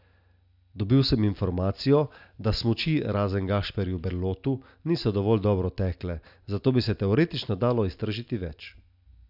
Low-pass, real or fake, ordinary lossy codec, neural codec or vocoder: 5.4 kHz; real; none; none